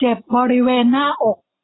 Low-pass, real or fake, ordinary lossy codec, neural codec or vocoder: 7.2 kHz; real; AAC, 16 kbps; none